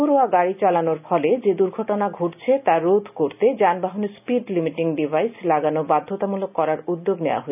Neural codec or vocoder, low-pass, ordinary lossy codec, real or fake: none; 3.6 kHz; none; real